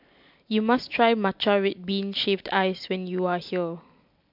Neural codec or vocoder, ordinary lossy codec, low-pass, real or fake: none; MP3, 48 kbps; 5.4 kHz; real